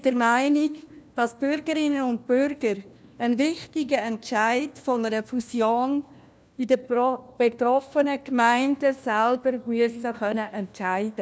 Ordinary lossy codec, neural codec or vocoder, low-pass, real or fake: none; codec, 16 kHz, 1 kbps, FunCodec, trained on LibriTTS, 50 frames a second; none; fake